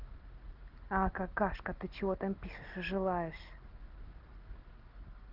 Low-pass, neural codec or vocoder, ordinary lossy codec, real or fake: 5.4 kHz; none; Opus, 16 kbps; real